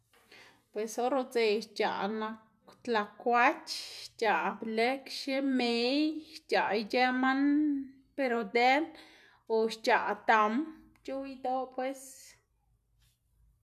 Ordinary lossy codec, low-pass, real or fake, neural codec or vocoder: none; 14.4 kHz; real; none